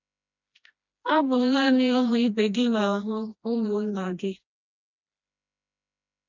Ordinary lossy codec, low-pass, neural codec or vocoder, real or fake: none; 7.2 kHz; codec, 16 kHz, 1 kbps, FreqCodec, smaller model; fake